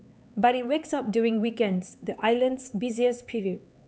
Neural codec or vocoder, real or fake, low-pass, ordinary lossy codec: codec, 16 kHz, 4 kbps, X-Codec, HuBERT features, trained on LibriSpeech; fake; none; none